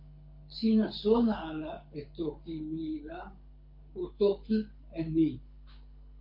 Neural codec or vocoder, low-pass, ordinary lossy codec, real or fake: codec, 24 kHz, 6 kbps, HILCodec; 5.4 kHz; MP3, 32 kbps; fake